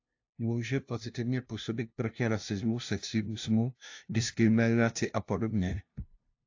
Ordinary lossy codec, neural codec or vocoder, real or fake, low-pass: AAC, 48 kbps; codec, 16 kHz, 0.5 kbps, FunCodec, trained on LibriTTS, 25 frames a second; fake; 7.2 kHz